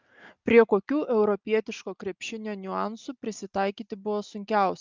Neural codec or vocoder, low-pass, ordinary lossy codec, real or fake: none; 7.2 kHz; Opus, 24 kbps; real